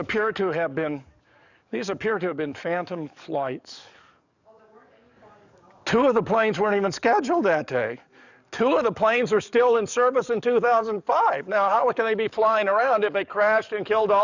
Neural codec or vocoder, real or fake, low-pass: codec, 44.1 kHz, 7.8 kbps, DAC; fake; 7.2 kHz